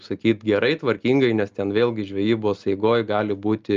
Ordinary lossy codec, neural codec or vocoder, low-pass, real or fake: Opus, 24 kbps; none; 7.2 kHz; real